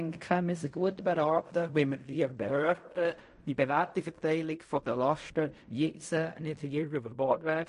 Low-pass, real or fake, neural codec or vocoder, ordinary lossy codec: 10.8 kHz; fake; codec, 16 kHz in and 24 kHz out, 0.4 kbps, LongCat-Audio-Codec, fine tuned four codebook decoder; MP3, 48 kbps